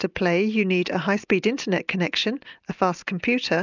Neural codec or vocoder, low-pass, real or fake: none; 7.2 kHz; real